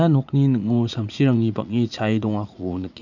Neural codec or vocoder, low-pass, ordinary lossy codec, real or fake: vocoder, 44.1 kHz, 80 mel bands, Vocos; 7.2 kHz; none; fake